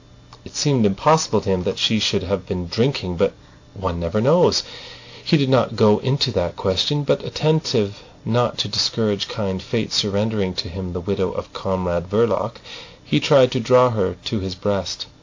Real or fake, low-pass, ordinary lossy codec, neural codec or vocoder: real; 7.2 kHz; AAC, 48 kbps; none